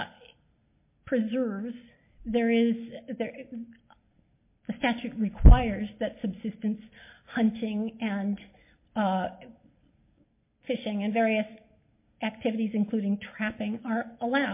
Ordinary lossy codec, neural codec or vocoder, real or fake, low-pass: MP3, 24 kbps; none; real; 3.6 kHz